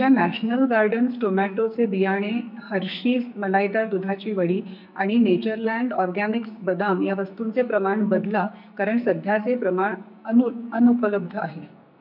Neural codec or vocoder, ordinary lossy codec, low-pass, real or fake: codec, 44.1 kHz, 2.6 kbps, SNAC; none; 5.4 kHz; fake